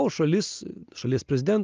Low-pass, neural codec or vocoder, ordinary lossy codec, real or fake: 7.2 kHz; none; Opus, 24 kbps; real